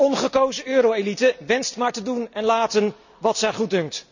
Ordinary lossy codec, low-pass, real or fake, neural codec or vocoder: none; 7.2 kHz; real; none